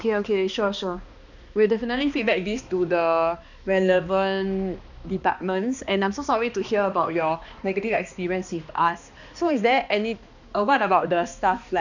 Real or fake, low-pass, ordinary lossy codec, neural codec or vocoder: fake; 7.2 kHz; none; codec, 16 kHz, 2 kbps, X-Codec, HuBERT features, trained on balanced general audio